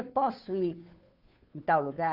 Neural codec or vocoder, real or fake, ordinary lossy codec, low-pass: codec, 16 kHz, 2 kbps, FunCodec, trained on Chinese and English, 25 frames a second; fake; Opus, 64 kbps; 5.4 kHz